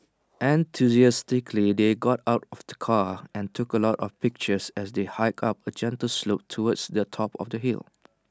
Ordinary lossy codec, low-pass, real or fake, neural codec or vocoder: none; none; real; none